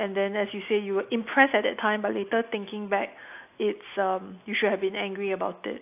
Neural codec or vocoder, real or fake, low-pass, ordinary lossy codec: none; real; 3.6 kHz; AAC, 32 kbps